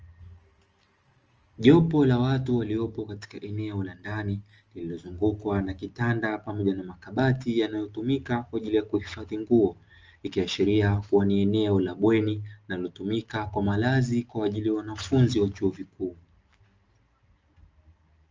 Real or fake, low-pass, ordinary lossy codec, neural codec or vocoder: real; 7.2 kHz; Opus, 24 kbps; none